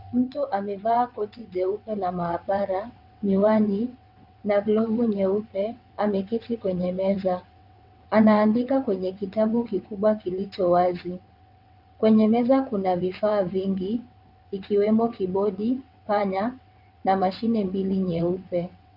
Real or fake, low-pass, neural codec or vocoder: fake; 5.4 kHz; vocoder, 22.05 kHz, 80 mel bands, WaveNeXt